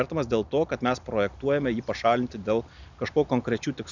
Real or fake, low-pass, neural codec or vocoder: real; 7.2 kHz; none